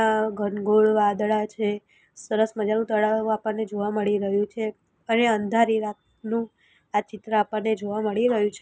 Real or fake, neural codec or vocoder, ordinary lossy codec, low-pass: real; none; none; none